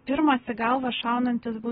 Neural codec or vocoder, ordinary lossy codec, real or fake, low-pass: none; AAC, 16 kbps; real; 19.8 kHz